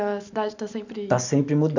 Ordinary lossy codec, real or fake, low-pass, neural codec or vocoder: none; real; 7.2 kHz; none